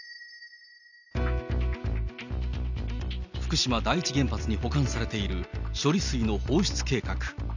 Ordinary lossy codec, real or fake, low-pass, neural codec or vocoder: none; real; 7.2 kHz; none